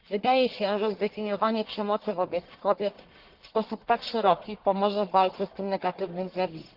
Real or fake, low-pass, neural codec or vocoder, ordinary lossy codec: fake; 5.4 kHz; codec, 44.1 kHz, 1.7 kbps, Pupu-Codec; Opus, 16 kbps